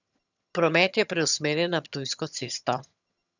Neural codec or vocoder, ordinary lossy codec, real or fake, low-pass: vocoder, 22.05 kHz, 80 mel bands, HiFi-GAN; none; fake; 7.2 kHz